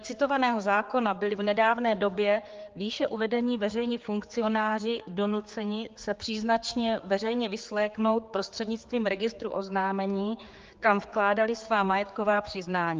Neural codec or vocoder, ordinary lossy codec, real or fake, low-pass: codec, 16 kHz, 4 kbps, X-Codec, HuBERT features, trained on general audio; Opus, 32 kbps; fake; 7.2 kHz